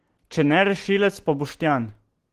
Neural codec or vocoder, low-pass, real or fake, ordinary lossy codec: none; 14.4 kHz; real; Opus, 16 kbps